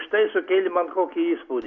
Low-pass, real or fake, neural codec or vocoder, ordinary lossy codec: 7.2 kHz; real; none; AAC, 64 kbps